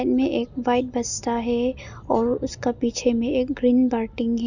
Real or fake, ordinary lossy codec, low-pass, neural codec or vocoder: real; none; 7.2 kHz; none